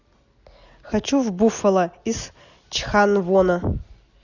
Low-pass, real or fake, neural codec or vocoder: 7.2 kHz; real; none